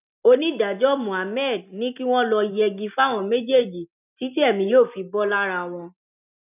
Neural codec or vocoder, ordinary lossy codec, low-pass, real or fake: none; none; 3.6 kHz; real